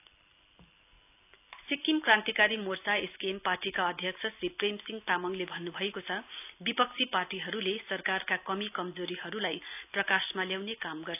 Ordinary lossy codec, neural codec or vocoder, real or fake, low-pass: none; none; real; 3.6 kHz